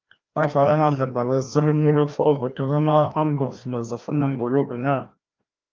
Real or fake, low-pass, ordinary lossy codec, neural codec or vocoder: fake; 7.2 kHz; Opus, 24 kbps; codec, 16 kHz, 1 kbps, FreqCodec, larger model